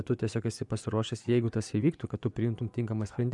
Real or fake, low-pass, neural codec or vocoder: fake; 10.8 kHz; vocoder, 24 kHz, 100 mel bands, Vocos